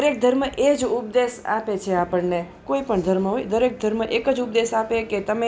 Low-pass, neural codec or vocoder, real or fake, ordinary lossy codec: none; none; real; none